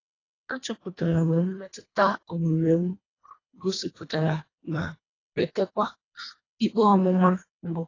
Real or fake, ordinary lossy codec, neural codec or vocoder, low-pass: fake; AAC, 32 kbps; codec, 24 kHz, 1.5 kbps, HILCodec; 7.2 kHz